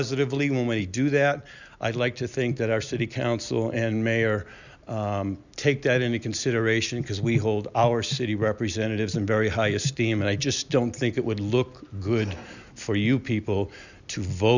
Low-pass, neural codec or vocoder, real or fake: 7.2 kHz; none; real